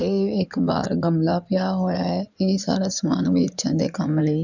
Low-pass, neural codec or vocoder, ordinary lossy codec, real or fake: 7.2 kHz; codec, 16 kHz in and 24 kHz out, 2.2 kbps, FireRedTTS-2 codec; none; fake